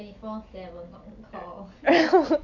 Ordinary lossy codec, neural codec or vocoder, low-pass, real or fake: AAC, 48 kbps; none; 7.2 kHz; real